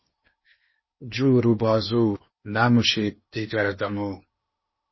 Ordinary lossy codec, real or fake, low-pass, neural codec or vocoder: MP3, 24 kbps; fake; 7.2 kHz; codec, 16 kHz in and 24 kHz out, 0.8 kbps, FocalCodec, streaming, 65536 codes